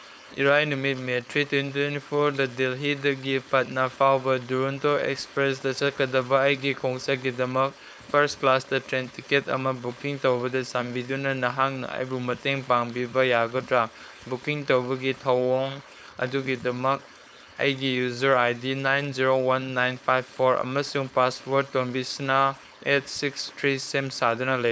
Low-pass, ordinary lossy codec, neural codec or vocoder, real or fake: none; none; codec, 16 kHz, 4.8 kbps, FACodec; fake